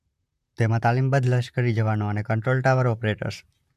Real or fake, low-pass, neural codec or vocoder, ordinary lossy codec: fake; 14.4 kHz; vocoder, 44.1 kHz, 128 mel bands, Pupu-Vocoder; AAC, 96 kbps